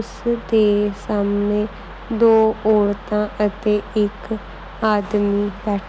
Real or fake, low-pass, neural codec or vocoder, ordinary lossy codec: real; none; none; none